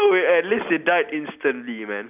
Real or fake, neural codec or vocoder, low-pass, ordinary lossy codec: real; none; 3.6 kHz; none